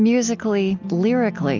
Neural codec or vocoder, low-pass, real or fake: none; 7.2 kHz; real